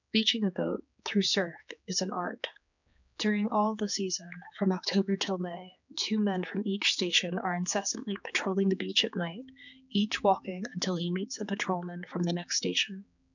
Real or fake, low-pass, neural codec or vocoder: fake; 7.2 kHz; codec, 16 kHz, 4 kbps, X-Codec, HuBERT features, trained on general audio